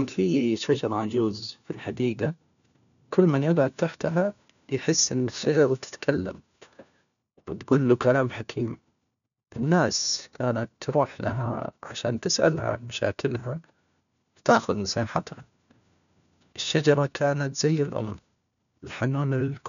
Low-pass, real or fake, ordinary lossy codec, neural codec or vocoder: 7.2 kHz; fake; none; codec, 16 kHz, 1 kbps, FunCodec, trained on LibriTTS, 50 frames a second